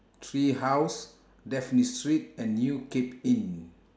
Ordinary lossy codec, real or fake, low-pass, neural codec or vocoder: none; real; none; none